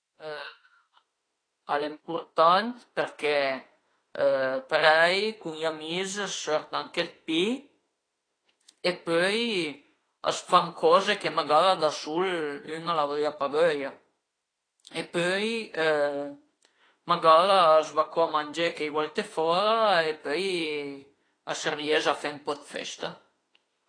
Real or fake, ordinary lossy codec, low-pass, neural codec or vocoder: fake; AAC, 32 kbps; 9.9 kHz; autoencoder, 48 kHz, 32 numbers a frame, DAC-VAE, trained on Japanese speech